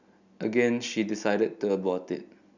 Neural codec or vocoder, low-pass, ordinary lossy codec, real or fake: none; 7.2 kHz; none; real